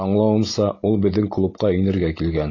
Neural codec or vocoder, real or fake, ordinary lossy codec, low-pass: none; real; AAC, 32 kbps; 7.2 kHz